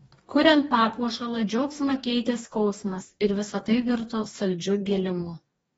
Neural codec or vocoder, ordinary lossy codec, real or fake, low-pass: codec, 44.1 kHz, 2.6 kbps, DAC; AAC, 24 kbps; fake; 19.8 kHz